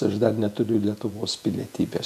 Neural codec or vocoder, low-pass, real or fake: vocoder, 48 kHz, 128 mel bands, Vocos; 14.4 kHz; fake